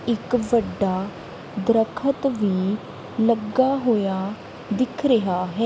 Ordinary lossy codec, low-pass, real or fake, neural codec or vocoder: none; none; real; none